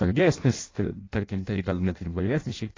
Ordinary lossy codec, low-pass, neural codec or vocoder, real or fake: AAC, 32 kbps; 7.2 kHz; codec, 16 kHz in and 24 kHz out, 0.6 kbps, FireRedTTS-2 codec; fake